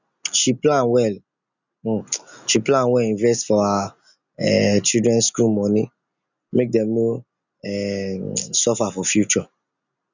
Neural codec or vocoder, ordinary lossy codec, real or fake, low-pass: none; none; real; 7.2 kHz